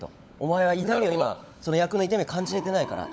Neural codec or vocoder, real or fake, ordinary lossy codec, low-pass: codec, 16 kHz, 16 kbps, FunCodec, trained on LibriTTS, 50 frames a second; fake; none; none